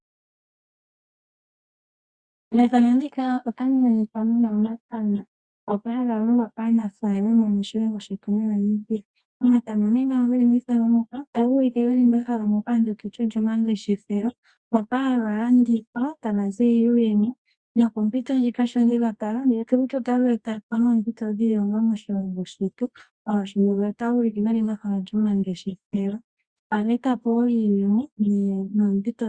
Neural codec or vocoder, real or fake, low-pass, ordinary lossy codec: codec, 24 kHz, 0.9 kbps, WavTokenizer, medium music audio release; fake; 9.9 kHz; Opus, 64 kbps